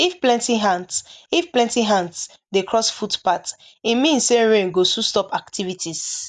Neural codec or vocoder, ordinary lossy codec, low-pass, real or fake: none; none; 9.9 kHz; real